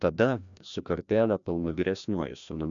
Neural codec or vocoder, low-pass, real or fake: codec, 16 kHz, 1 kbps, FreqCodec, larger model; 7.2 kHz; fake